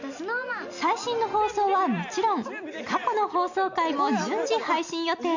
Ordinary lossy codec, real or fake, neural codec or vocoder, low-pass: none; real; none; 7.2 kHz